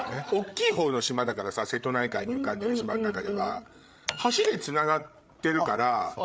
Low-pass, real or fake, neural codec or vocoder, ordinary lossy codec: none; fake; codec, 16 kHz, 8 kbps, FreqCodec, larger model; none